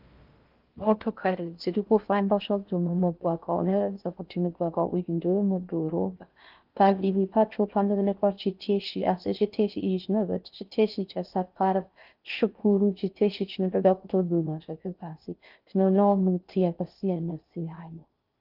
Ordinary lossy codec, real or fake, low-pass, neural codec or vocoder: Opus, 24 kbps; fake; 5.4 kHz; codec, 16 kHz in and 24 kHz out, 0.6 kbps, FocalCodec, streaming, 4096 codes